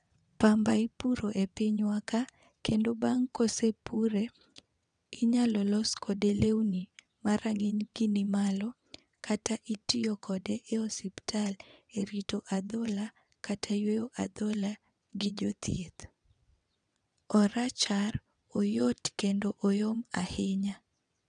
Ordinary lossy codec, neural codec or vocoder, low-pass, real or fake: none; vocoder, 22.05 kHz, 80 mel bands, WaveNeXt; 9.9 kHz; fake